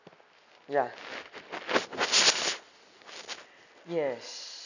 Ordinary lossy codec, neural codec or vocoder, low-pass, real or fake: none; none; 7.2 kHz; real